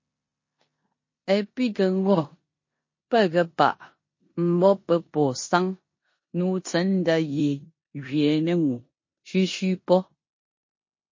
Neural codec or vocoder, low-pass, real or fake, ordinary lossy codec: codec, 16 kHz in and 24 kHz out, 0.9 kbps, LongCat-Audio-Codec, four codebook decoder; 7.2 kHz; fake; MP3, 32 kbps